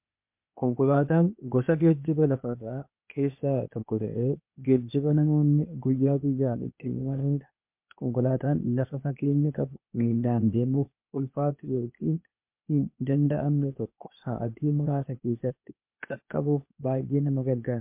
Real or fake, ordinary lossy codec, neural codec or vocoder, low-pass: fake; MP3, 32 kbps; codec, 16 kHz, 0.8 kbps, ZipCodec; 3.6 kHz